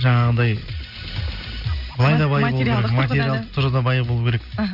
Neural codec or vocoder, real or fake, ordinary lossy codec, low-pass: none; real; none; 5.4 kHz